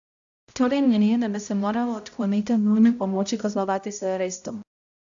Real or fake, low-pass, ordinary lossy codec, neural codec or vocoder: fake; 7.2 kHz; AAC, 64 kbps; codec, 16 kHz, 0.5 kbps, X-Codec, HuBERT features, trained on balanced general audio